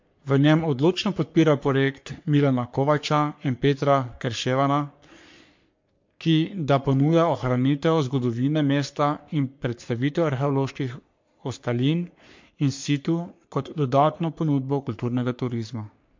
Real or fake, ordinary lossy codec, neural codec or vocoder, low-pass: fake; MP3, 48 kbps; codec, 44.1 kHz, 3.4 kbps, Pupu-Codec; 7.2 kHz